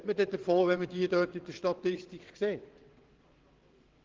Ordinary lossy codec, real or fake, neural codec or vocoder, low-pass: Opus, 16 kbps; fake; vocoder, 22.05 kHz, 80 mel bands, WaveNeXt; 7.2 kHz